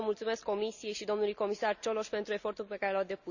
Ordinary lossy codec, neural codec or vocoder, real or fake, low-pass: none; none; real; 7.2 kHz